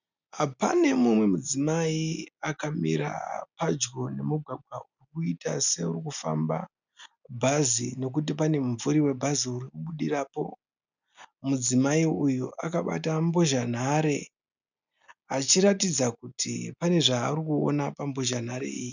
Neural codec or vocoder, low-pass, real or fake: none; 7.2 kHz; real